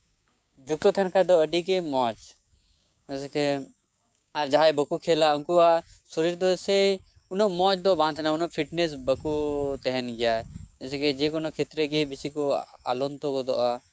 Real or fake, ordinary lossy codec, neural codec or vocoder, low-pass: fake; none; codec, 16 kHz, 6 kbps, DAC; none